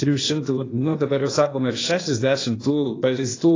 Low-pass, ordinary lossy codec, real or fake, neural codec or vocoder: 7.2 kHz; AAC, 32 kbps; fake; codec, 16 kHz, 0.8 kbps, ZipCodec